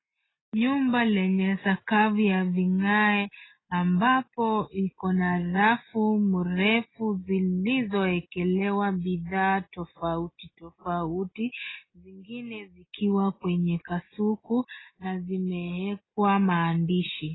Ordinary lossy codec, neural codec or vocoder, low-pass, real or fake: AAC, 16 kbps; none; 7.2 kHz; real